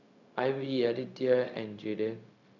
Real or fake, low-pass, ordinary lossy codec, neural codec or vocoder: fake; 7.2 kHz; none; codec, 16 kHz, 0.4 kbps, LongCat-Audio-Codec